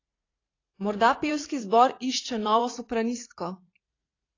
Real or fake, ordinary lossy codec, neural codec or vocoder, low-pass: fake; AAC, 32 kbps; vocoder, 44.1 kHz, 80 mel bands, Vocos; 7.2 kHz